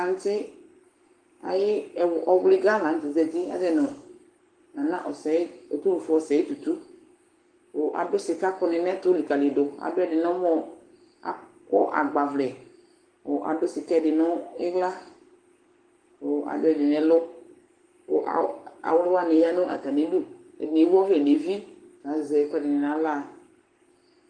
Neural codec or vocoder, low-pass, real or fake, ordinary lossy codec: codec, 44.1 kHz, 7.8 kbps, Pupu-Codec; 9.9 kHz; fake; Opus, 24 kbps